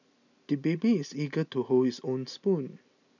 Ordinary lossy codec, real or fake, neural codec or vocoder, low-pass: none; real; none; 7.2 kHz